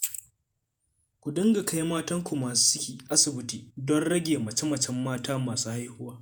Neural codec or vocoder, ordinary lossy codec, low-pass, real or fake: vocoder, 48 kHz, 128 mel bands, Vocos; none; none; fake